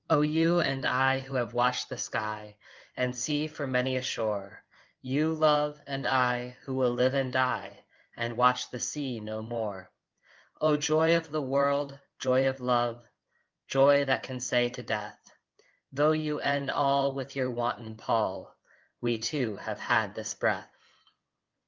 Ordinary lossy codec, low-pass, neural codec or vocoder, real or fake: Opus, 24 kbps; 7.2 kHz; codec, 16 kHz in and 24 kHz out, 2.2 kbps, FireRedTTS-2 codec; fake